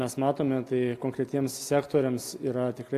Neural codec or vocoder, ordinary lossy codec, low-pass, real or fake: none; AAC, 64 kbps; 14.4 kHz; real